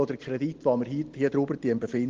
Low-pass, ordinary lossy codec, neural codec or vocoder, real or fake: 7.2 kHz; Opus, 16 kbps; none; real